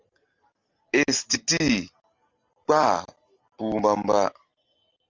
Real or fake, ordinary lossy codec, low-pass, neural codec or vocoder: real; Opus, 32 kbps; 7.2 kHz; none